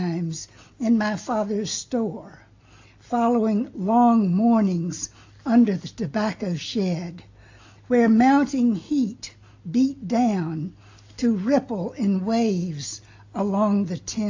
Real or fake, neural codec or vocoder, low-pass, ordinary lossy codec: real; none; 7.2 kHz; AAC, 48 kbps